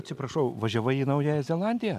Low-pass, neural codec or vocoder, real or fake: 14.4 kHz; none; real